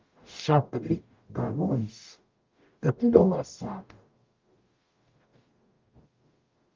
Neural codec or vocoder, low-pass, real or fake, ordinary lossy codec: codec, 44.1 kHz, 0.9 kbps, DAC; 7.2 kHz; fake; Opus, 24 kbps